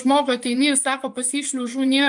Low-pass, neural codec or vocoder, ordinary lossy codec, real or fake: 10.8 kHz; vocoder, 24 kHz, 100 mel bands, Vocos; MP3, 96 kbps; fake